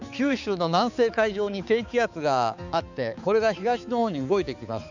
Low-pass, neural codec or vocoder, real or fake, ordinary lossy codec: 7.2 kHz; codec, 16 kHz, 4 kbps, X-Codec, HuBERT features, trained on balanced general audio; fake; none